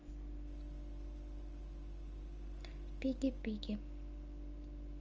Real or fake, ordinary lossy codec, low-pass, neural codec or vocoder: real; Opus, 24 kbps; 7.2 kHz; none